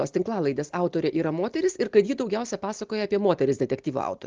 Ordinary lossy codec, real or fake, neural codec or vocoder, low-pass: Opus, 24 kbps; real; none; 7.2 kHz